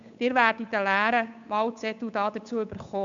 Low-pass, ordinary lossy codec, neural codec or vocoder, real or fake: 7.2 kHz; MP3, 64 kbps; codec, 16 kHz, 8 kbps, FunCodec, trained on Chinese and English, 25 frames a second; fake